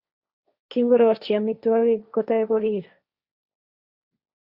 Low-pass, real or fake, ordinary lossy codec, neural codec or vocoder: 5.4 kHz; fake; Opus, 64 kbps; codec, 16 kHz, 1.1 kbps, Voila-Tokenizer